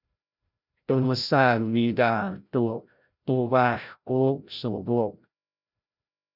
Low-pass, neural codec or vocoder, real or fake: 5.4 kHz; codec, 16 kHz, 0.5 kbps, FreqCodec, larger model; fake